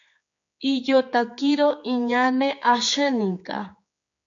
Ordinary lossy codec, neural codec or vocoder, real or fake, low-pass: MP3, 48 kbps; codec, 16 kHz, 4 kbps, X-Codec, HuBERT features, trained on general audio; fake; 7.2 kHz